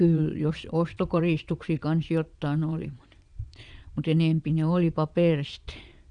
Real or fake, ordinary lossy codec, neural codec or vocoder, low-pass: fake; none; vocoder, 22.05 kHz, 80 mel bands, Vocos; 9.9 kHz